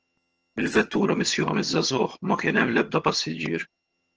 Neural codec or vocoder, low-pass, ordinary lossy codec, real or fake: vocoder, 22.05 kHz, 80 mel bands, HiFi-GAN; 7.2 kHz; Opus, 16 kbps; fake